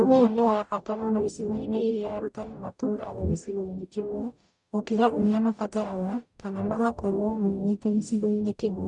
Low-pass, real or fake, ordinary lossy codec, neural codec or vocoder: 10.8 kHz; fake; none; codec, 44.1 kHz, 0.9 kbps, DAC